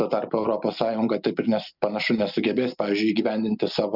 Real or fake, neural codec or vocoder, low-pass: real; none; 5.4 kHz